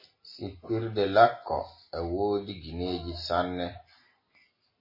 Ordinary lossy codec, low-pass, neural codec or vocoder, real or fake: MP3, 24 kbps; 5.4 kHz; none; real